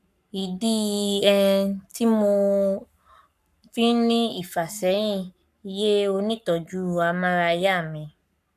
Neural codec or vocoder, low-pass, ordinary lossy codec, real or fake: codec, 44.1 kHz, 7.8 kbps, Pupu-Codec; 14.4 kHz; none; fake